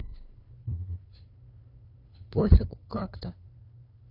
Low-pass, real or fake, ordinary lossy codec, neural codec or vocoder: 5.4 kHz; fake; AAC, 32 kbps; codec, 16 kHz, 2 kbps, FunCodec, trained on LibriTTS, 25 frames a second